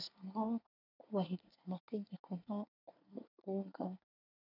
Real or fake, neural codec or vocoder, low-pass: fake; codec, 24 kHz, 1 kbps, SNAC; 5.4 kHz